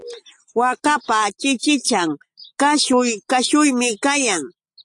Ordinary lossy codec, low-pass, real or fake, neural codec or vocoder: AAC, 64 kbps; 10.8 kHz; real; none